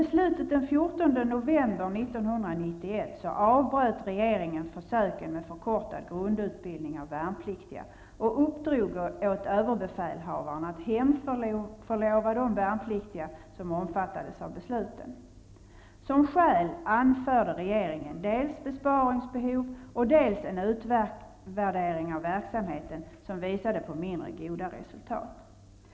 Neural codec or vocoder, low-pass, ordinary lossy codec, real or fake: none; none; none; real